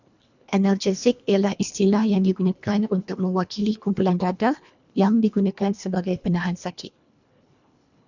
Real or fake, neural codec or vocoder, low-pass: fake; codec, 24 kHz, 1.5 kbps, HILCodec; 7.2 kHz